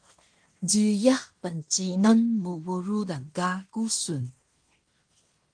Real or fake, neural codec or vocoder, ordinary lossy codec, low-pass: fake; codec, 16 kHz in and 24 kHz out, 0.9 kbps, LongCat-Audio-Codec, fine tuned four codebook decoder; Opus, 24 kbps; 9.9 kHz